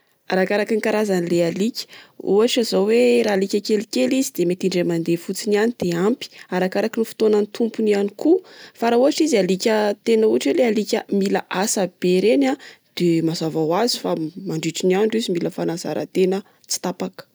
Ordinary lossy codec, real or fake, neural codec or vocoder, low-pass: none; real; none; none